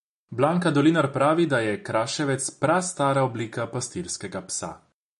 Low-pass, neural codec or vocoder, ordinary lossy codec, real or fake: 14.4 kHz; none; MP3, 48 kbps; real